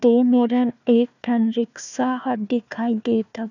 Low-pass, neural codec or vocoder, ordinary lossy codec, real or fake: 7.2 kHz; codec, 16 kHz, 1 kbps, FunCodec, trained on Chinese and English, 50 frames a second; none; fake